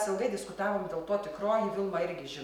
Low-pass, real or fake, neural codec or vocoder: 19.8 kHz; real; none